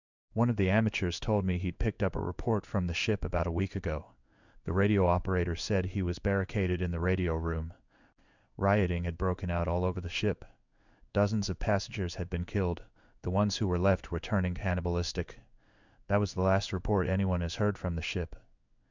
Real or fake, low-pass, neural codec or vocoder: fake; 7.2 kHz; codec, 16 kHz in and 24 kHz out, 1 kbps, XY-Tokenizer